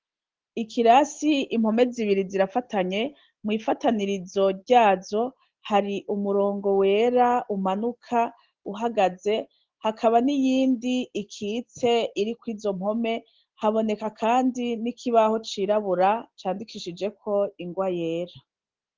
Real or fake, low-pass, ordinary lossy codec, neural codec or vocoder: real; 7.2 kHz; Opus, 16 kbps; none